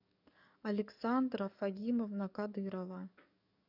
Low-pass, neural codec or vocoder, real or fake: 5.4 kHz; codec, 44.1 kHz, 7.8 kbps, DAC; fake